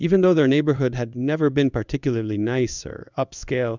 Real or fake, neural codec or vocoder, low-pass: fake; codec, 16 kHz in and 24 kHz out, 1 kbps, XY-Tokenizer; 7.2 kHz